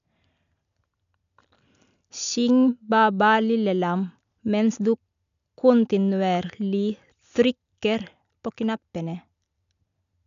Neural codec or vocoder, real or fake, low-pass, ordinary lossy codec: none; real; 7.2 kHz; none